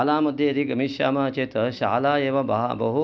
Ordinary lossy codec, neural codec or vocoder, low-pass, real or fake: none; none; none; real